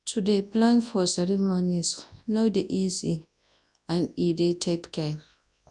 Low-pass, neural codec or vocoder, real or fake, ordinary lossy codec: 10.8 kHz; codec, 24 kHz, 0.9 kbps, WavTokenizer, large speech release; fake; none